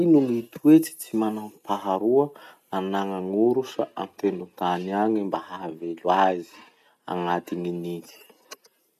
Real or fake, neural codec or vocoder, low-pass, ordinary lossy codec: real; none; 14.4 kHz; none